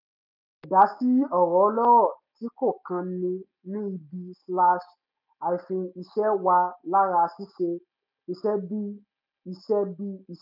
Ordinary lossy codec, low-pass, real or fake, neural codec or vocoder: none; 5.4 kHz; real; none